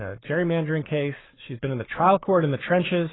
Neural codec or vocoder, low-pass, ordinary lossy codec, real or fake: codec, 44.1 kHz, 7.8 kbps, Pupu-Codec; 7.2 kHz; AAC, 16 kbps; fake